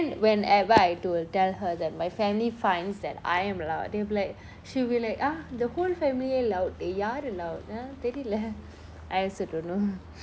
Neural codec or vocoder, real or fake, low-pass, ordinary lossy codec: none; real; none; none